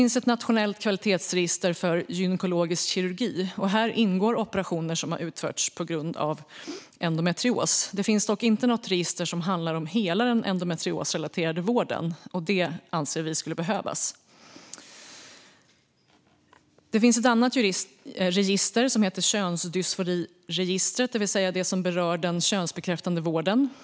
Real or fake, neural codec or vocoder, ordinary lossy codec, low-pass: real; none; none; none